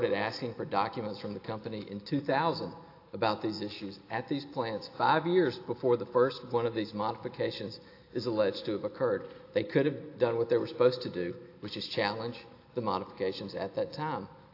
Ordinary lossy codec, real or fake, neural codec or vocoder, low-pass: AAC, 32 kbps; real; none; 5.4 kHz